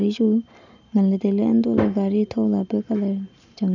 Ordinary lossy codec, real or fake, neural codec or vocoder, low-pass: none; real; none; 7.2 kHz